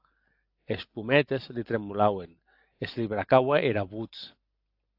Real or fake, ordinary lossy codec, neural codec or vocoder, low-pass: real; AAC, 48 kbps; none; 5.4 kHz